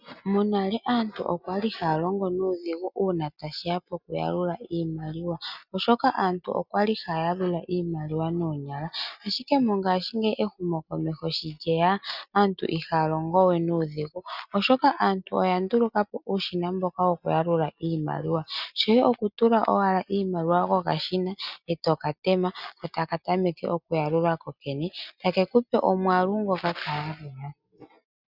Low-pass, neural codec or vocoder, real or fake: 5.4 kHz; none; real